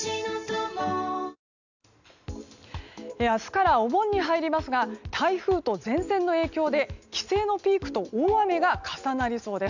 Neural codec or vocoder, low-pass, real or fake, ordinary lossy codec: none; 7.2 kHz; real; none